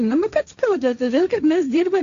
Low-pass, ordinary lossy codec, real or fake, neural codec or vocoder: 7.2 kHz; Opus, 64 kbps; fake; codec, 16 kHz, 1.1 kbps, Voila-Tokenizer